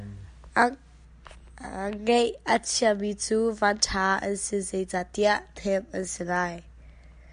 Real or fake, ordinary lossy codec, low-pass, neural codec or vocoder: real; AAC, 96 kbps; 9.9 kHz; none